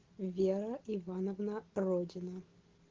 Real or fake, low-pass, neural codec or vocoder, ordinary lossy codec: real; 7.2 kHz; none; Opus, 16 kbps